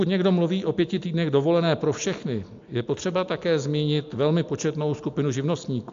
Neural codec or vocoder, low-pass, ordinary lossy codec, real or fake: none; 7.2 kHz; AAC, 64 kbps; real